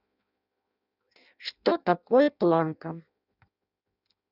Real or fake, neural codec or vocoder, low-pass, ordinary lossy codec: fake; codec, 16 kHz in and 24 kHz out, 0.6 kbps, FireRedTTS-2 codec; 5.4 kHz; none